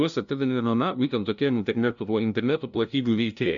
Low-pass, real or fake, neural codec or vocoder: 7.2 kHz; fake; codec, 16 kHz, 0.5 kbps, FunCodec, trained on LibriTTS, 25 frames a second